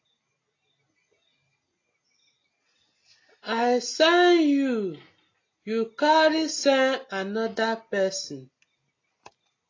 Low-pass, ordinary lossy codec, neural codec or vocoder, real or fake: 7.2 kHz; AAC, 32 kbps; none; real